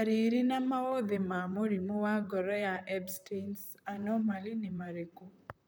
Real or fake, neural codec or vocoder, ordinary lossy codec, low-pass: fake; vocoder, 44.1 kHz, 128 mel bands, Pupu-Vocoder; none; none